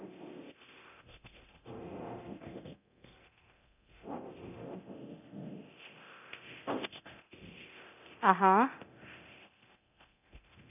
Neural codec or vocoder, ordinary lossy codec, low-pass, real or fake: codec, 24 kHz, 0.9 kbps, DualCodec; none; 3.6 kHz; fake